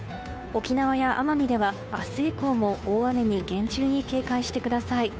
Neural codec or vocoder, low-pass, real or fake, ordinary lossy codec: codec, 16 kHz, 2 kbps, FunCodec, trained on Chinese and English, 25 frames a second; none; fake; none